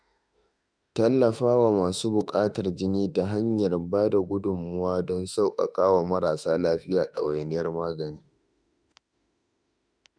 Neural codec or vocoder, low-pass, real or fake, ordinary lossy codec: autoencoder, 48 kHz, 32 numbers a frame, DAC-VAE, trained on Japanese speech; 9.9 kHz; fake; none